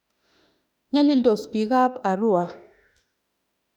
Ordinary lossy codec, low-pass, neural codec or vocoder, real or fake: none; 19.8 kHz; autoencoder, 48 kHz, 32 numbers a frame, DAC-VAE, trained on Japanese speech; fake